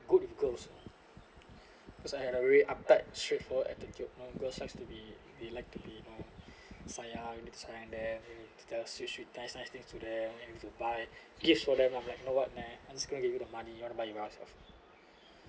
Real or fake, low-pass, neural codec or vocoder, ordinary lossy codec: real; none; none; none